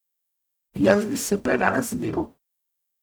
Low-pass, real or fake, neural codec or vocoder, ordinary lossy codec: none; fake; codec, 44.1 kHz, 0.9 kbps, DAC; none